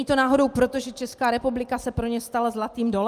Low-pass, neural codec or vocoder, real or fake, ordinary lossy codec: 14.4 kHz; none; real; Opus, 32 kbps